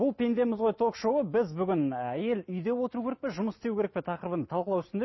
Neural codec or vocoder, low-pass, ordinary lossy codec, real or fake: none; 7.2 kHz; MP3, 24 kbps; real